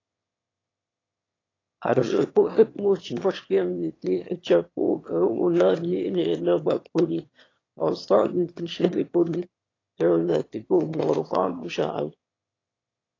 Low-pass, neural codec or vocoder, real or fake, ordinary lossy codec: 7.2 kHz; autoencoder, 22.05 kHz, a latent of 192 numbers a frame, VITS, trained on one speaker; fake; AAC, 32 kbps